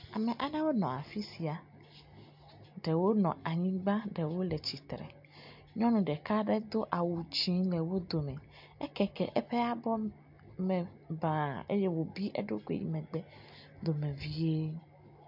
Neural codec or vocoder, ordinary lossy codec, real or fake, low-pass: none; MP3, 48 kbps; real; 5.4 kHz